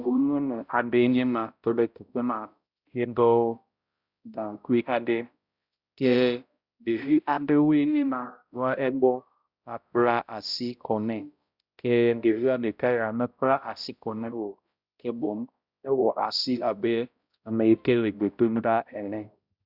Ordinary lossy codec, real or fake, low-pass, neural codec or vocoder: Opus, 64 kbps; fake; 5.4 kHz; codec, 16 kHz, 0.5 kbps, X-Codec, HuBERT features, trained on balanced general audio